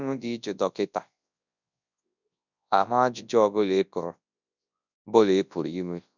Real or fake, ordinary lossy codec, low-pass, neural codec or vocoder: fake; none; 7.2 kHz; codec, 24 kHz, 0.9 kbps, WavTokenizer, large speech release